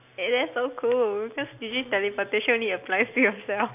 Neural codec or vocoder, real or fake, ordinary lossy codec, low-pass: none; real; none; 3.6 kHz